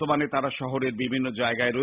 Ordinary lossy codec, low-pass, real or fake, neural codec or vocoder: Opus, 64 kbps; 3.6 kHz; real; none